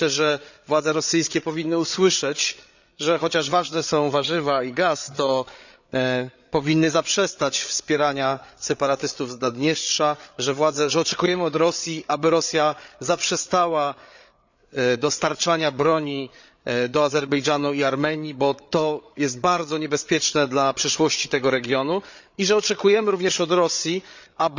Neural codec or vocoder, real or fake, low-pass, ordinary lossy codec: codec, 16 kHz, 8 kbps, FreqCodec, larger model; fake; 7.2 kHz; none